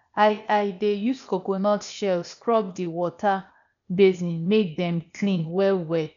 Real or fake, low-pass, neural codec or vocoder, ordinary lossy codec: fake; 7.2 kHz; codec, 16 kHz, 0.8 kbps, ZipCodec; none